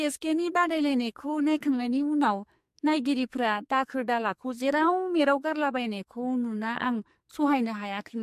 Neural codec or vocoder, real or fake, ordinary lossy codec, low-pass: codec, 44.1 kHz, 2.6 kbps, SNAC; fake; MP3, 64 kbps; 14.4 kHz